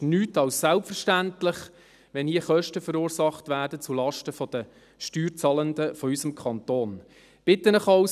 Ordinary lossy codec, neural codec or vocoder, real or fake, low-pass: none; none; real; 14.4 kHz